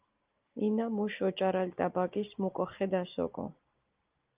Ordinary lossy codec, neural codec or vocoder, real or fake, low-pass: Opus, 24 kbps; none; real; 3.6 kHz